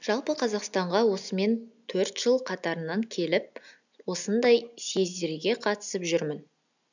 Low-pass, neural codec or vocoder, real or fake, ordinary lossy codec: 7.2 kHz; none; real; none